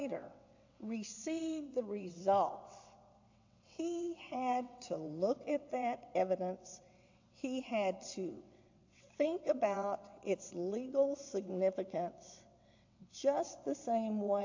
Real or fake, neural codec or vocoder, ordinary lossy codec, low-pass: fake; vocoder, 22.05 kHz, 80 mel bands, WaveNeXt; AAC, 48 kbps; 7.2 kHz